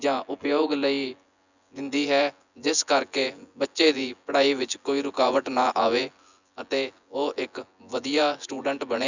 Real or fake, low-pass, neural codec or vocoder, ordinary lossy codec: fake; 7.2 kHz; vocoder, 24 kHz, 100 mel bands, Vocos; none